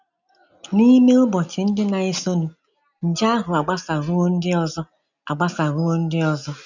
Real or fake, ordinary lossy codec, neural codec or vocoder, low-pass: real; none; none; 7.2 kHz